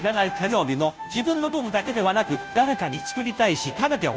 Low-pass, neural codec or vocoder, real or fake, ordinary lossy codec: none; codec, 16 kHz, 0.5 kbps, FunCodec, trained on Chinese and English, 25 frames a second; fake; none